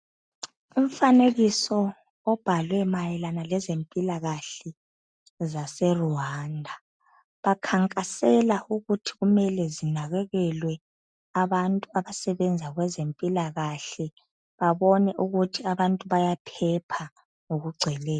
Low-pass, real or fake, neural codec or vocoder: 9.9 kHz; real; none